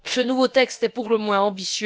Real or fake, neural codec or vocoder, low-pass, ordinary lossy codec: fake; codec, 16 kHz, about 1 kbps, DyCAST, with the encoder's durations; none; none